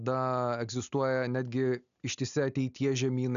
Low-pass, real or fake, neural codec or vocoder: 7.2 kHz; real; none